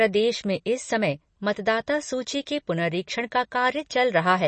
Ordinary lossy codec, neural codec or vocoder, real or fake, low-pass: MP3, 32 kbps; none; real; 10.8 kHz